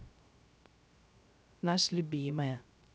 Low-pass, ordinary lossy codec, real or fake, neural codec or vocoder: none; none; fake; codec, 16 kHz, 0.3 kbps, FocalCodec